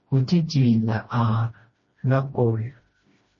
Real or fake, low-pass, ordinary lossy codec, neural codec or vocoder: fake; 7.2 kHz; MP3, 32 kbps; codec, 16 kHz, 1 kbps, FreqCodec, smaller model